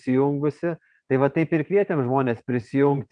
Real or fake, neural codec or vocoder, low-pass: fake; vocoder, 22.05 kHz, 80 mel bands, WaveNeXt; 9.9 kHz